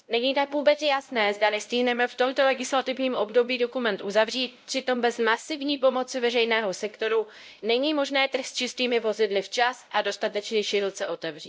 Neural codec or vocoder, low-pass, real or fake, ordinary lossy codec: codec, 16 kHz, 0.5 kbps, X-Codec, WavLM features, trained on Multilingual LibriSpeech; none; fake; none